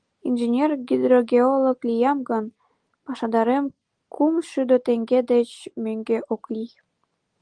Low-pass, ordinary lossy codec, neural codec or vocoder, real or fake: 9.9 kHz; Opus, 24 kbps; none; real